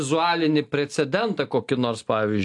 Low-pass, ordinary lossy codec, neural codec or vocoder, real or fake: 10.8 kHz; AAC, 64 kbps; none; real